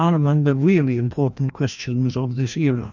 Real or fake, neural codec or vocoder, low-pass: fake; codec, 16 kHz, 1 kbps, FreqCodec, larger model; 7.2 kHz